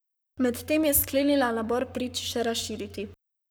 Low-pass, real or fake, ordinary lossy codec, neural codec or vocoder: none; fake; none; codec, 44.1 kHz, 7.8 kbps, Pupu-Codec